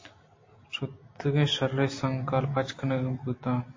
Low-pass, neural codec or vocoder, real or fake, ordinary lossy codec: 7.2 kHz; none; real; MP3, 32 kbps